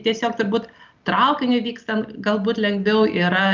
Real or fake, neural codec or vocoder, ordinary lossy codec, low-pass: real; none; Opus, 24 kbps; 7.2 kHz